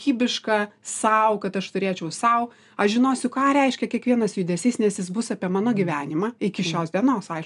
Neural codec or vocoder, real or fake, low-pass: none; real; 10.8 kHz